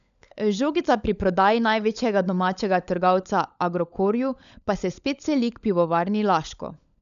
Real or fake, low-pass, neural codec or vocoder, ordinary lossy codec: fake; 7.2 kHz; codec, 16 kHz, 16 kbps, FunCodec, trained on LibriTTS, 50 frames a second; none